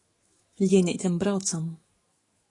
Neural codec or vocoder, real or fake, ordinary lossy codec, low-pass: autoencoder, 48 kHz, 128 numbers a frame, DAC-VAE, trained on Japanese speech; fake; AAC, 32 kbps; 10.8 kHz